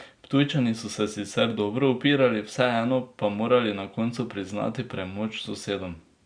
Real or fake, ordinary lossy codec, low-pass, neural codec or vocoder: real; Opus, 64 kbps; 9.9 kHz; none